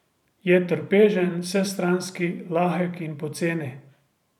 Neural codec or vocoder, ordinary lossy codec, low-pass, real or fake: vocoder, 44.1 kHz, 128 mel bands every 512 samples, BigVGAN v2; none; 19.8 kHz; fake